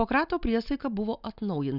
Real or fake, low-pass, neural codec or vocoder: real; 5.4 kHz; none